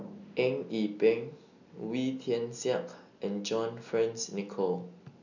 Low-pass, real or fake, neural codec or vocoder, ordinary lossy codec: 7.2 kHz; real; none; none